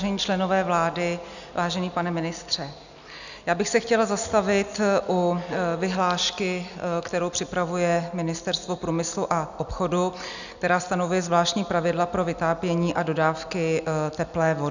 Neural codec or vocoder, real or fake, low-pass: none; real; 7.2 kHz